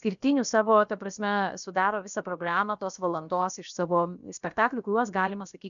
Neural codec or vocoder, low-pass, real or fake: codec, 16 kHz, about 1 kbps, DyCAST, with the encoder's durations; 7.2 kHz; fake